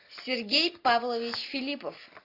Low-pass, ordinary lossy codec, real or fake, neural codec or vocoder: 5.4 kHz; AAC, 32 kbps; real; none